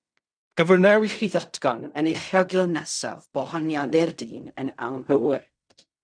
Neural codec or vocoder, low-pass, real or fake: codec, 16 kHz in and 24 kHz out, 0.4 kbps, LongCat-Audio-Codec, fine tuned four codebook decoder; 9.9 kHz; fake